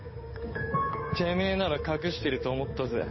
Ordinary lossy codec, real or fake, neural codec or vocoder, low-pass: MP3, 24 kbps; fake; codec, 16 kHz, 8 kbps, FunCodec, trained on Chinese and English, 25 frames a second; 7.2 kHz